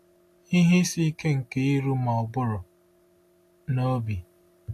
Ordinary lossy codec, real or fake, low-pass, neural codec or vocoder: AAC, 64 kbps; real; 14.4 kHz; none